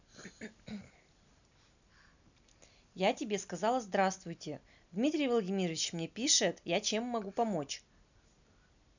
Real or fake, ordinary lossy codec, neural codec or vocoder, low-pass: real; none; none; 7.2 kHz